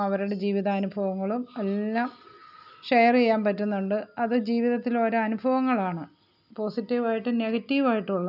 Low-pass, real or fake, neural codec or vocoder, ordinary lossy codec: 5.4 kHz; real; none; none